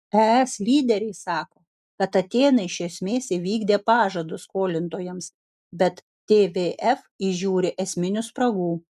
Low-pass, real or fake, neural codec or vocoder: 14.4 kHz; real; none